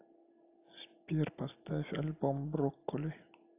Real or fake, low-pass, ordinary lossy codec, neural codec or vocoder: real; 3.6 kHz; AAC, 32 kbps; none